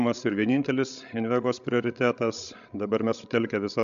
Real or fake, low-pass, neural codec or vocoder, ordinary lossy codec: fake; 7.2 kHz; codec, 16 kHz, 16 kbps, FreqCodec, larger model; AAC, 96 kbps